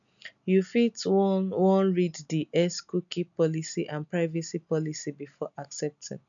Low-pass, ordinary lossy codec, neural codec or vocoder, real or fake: 7.2 kHz; none; none; real